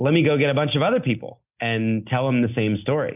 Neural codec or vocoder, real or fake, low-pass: none; real; 3.6 kHz